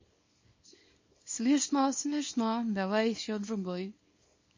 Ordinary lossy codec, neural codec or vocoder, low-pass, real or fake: MP3, 32 kbps; codec, 24 kHz, 0.9 kbps, WavTokenizer, small release; 7.2 kHz; fake